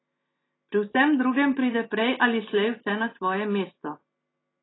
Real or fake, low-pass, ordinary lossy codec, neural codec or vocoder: real; 7.2 kHz; AAC, 16 kbps; none